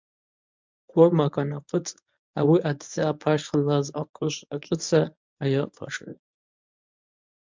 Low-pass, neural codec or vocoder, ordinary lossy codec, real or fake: 7.2 kHz; codec, 24 kHz, 0.9 kbps, WavTokenizer, medium speech release version 1; MP3, 64 kbps; fake